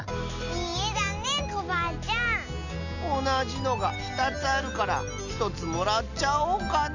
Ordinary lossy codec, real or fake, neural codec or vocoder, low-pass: none; real; none; 7.2 kHz